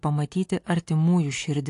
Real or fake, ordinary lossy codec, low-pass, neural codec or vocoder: real; AAC, 48 kbps; 10.8 kHz; none